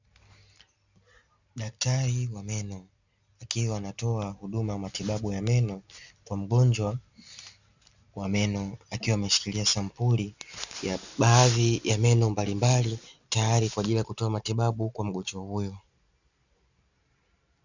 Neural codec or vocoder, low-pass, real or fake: none; 7.2 kHz; real